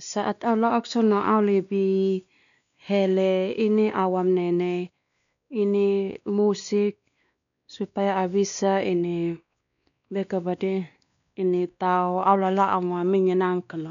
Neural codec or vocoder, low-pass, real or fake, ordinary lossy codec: codec, 16 kHz, 2 kbps, X-Codec, WavLM features, trained on Multilingual LibriSpeech; 7.2 kHz; fake; none